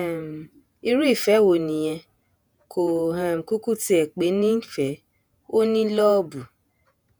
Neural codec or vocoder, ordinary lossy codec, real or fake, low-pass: vocoder, 48 kHz, 128 mel bands, Vocos; none; fake; none